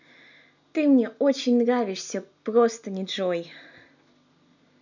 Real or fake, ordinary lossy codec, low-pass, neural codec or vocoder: real; none; 7.2 kHz; none